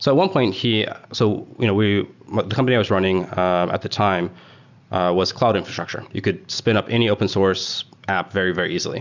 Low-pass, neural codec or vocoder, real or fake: 7.2 kHz; none; real